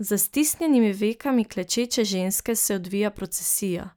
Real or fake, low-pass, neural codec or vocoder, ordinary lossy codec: real; none; none; none